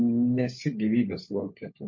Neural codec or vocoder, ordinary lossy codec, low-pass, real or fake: codec, 16 kHz, 16 kbps, FunCodec, trained on LibriTTS, 50 frames a second; MP3, 32 kbps; 7.2 kHz; fake